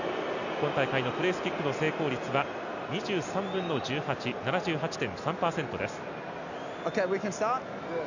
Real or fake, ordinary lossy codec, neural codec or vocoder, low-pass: real; none; none; 7.2 kHz